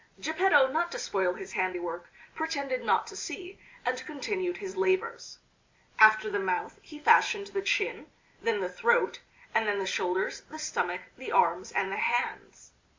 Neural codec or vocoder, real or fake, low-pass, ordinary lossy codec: none; real; 7.2 kHz; MP3, 64 kbps